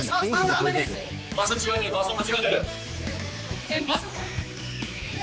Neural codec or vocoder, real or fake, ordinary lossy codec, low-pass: codec, 16 kHz, 4 kbps, X-Codec, HuBERT features, trained on general audio; fake; none; none